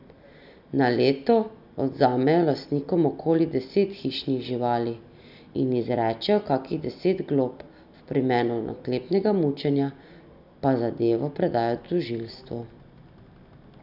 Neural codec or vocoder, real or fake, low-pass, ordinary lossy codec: none; real; 5.4 kHz; none